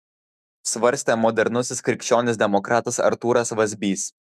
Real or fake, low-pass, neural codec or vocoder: fake; 14.4 kHz; autoencoder, 48 kHz, 128 numbers a frame, DAC-VAE, trained on Japanese speech